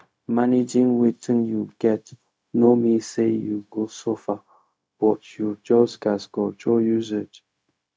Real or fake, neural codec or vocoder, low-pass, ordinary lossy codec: fake; codec, 16 kHz, 0.4 kbps, LongCat-Audio-Codec; none; none